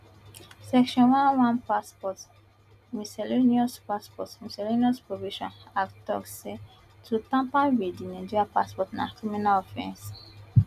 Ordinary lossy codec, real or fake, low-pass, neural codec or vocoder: none; real; 14.4 kHz; none